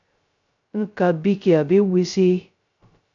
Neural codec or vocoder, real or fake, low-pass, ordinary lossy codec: codec, 16 kHz, 0.2 kbps, FocalCodec; fake; 7.2 kHz; AAC, 64 kbps